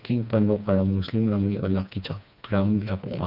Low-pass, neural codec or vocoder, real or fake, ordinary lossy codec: 5.4 kHz; codec, 16 kHz, 2 kbps, FreqCodec, smaller model; fake; none